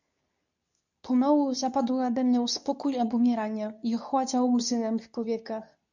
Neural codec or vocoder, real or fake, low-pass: codec, 24 kHz, 0.9 kbps, WavTokenizer, medium speech release version 2; fake; 7.2 kHz